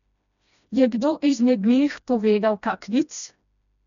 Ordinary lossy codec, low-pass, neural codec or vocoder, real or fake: none; 7.2 kHz; codec, 16 kHz, 1 kbps, FreqCodec, smaller model; fake